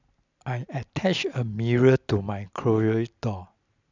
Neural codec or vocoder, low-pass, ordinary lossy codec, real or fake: none; 7.2 kHz; none; real